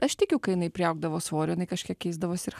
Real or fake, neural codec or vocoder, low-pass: real; none; 14.4 kHz